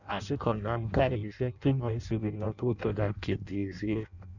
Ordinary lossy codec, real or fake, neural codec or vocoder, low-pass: none; fake; codec, 16 kHz in and 24 kHz out, 0.6 kbps, FireRedTTS-2 codec; 7.2 kHz